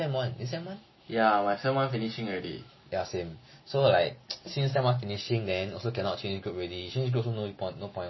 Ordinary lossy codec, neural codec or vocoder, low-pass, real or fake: MP3, 24 kbps; none; 7.2 kHz; real